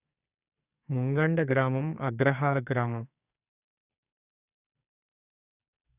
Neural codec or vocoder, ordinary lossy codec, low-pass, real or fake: codec, 44.1 kHz, 2.6 kbps, SNAC; none; 3.6 kHz; fake